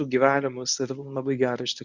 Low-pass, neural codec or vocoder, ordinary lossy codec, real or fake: 7.2 kHz; codec, 24 kHz, 0.9 kbps, WavTokenizer, medium speech release version 1; Opus, 64 kbps; fake